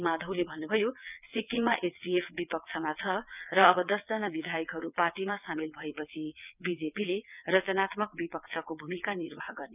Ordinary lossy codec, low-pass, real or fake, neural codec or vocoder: none; 3.6 kHz; fake; vocoder, 22.05 kHz, 80 mel bands, WaveNeXt